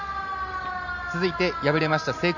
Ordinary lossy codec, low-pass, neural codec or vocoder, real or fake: none; 7.2 kHz; none; real